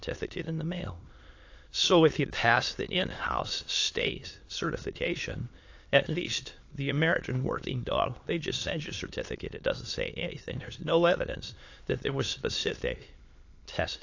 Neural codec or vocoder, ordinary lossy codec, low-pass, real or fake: autoencoder, 22.05 kHz, a latent of 192 numbers a frame, VITS, trained on many speakers; AAC, 48 kbps; 7.2 kHz; fake